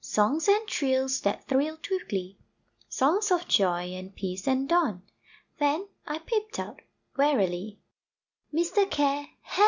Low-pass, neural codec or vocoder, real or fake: 7.2 kHz; none; real